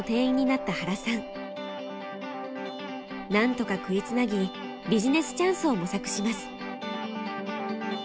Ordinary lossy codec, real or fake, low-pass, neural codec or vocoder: none; real; none; none